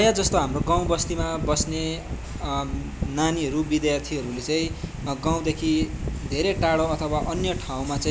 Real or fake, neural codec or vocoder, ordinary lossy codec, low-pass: real; none; none; none